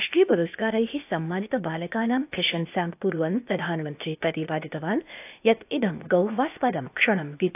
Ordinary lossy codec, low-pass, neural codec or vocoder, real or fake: AAC, 32 kbps; 3.6 kHz; codec, 16 kHz, 0.8 kbps, ZipCodec; fake